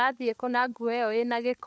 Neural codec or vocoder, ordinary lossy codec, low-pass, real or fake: codec, 16 kHz, 4 kbps, FunCodec, trained on LibriTTS, 50 frames a second; none; none; fake